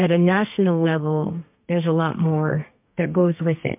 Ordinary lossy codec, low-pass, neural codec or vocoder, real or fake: MP3, 32 kbps; 3.6 kHz; codec, 32 kHz, 1.9 kbps, SNAC; fake